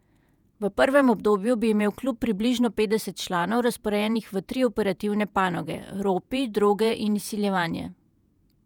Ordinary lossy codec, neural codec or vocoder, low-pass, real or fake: none; vocoder, 48 kHz, 128 mel bands, Vocos; 19.8 kHz; fake